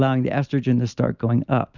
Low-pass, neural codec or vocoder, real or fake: 7.2 kHz; none; real